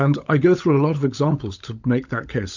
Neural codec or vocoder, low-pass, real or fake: codec, 16 kHz, 16 kbps, FunCodec, trained on LibriTTS, 50 frames a second; 7.2 kHz; fake